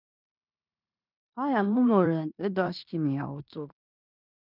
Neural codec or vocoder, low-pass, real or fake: codec, 16 kHz in and 24 kHz out, 0.9 kbps, LongCat-Audio-Codec, fine tuned four codebook decoder; 5.4 kHz; fake